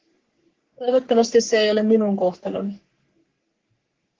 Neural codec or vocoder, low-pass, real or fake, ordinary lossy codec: codec, 44.1 kHz, 3.4 kbps, Pupu-Codec; 7.2 kHz; fake; Opus, 16 kbps